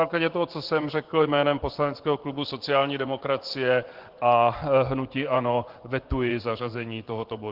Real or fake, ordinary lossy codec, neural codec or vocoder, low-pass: fake; Opus, 16 kbps; vocoder, 24 kHz, 100 mel bands, Vocos; 5.4 kHz